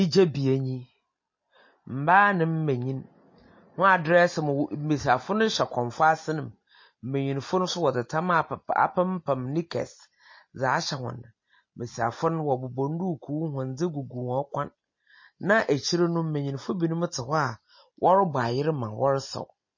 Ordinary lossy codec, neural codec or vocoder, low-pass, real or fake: MP3, 32 kbps; none; 7.2 kHz; real